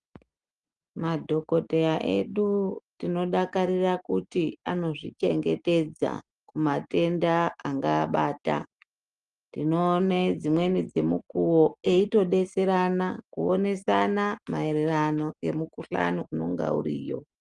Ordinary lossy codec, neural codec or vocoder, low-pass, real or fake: Opus, 32 kbps; none; 10.8 kHz; real